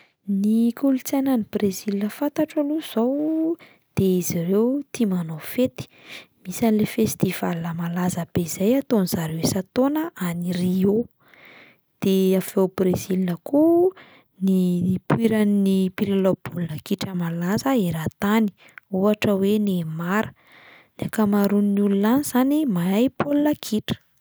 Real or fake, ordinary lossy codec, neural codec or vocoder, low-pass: real; none; none; none